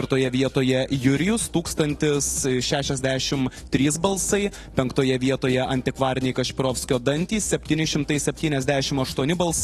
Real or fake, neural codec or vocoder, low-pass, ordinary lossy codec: fake; autoencoder, 48 kHz, 128 numbers a frame, DAC-VAE, trained on Japanese speech; 19.8 kHz; AAC, 32 kbps